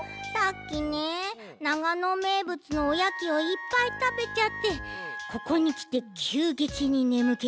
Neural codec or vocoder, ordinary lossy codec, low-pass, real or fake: none; none; none; real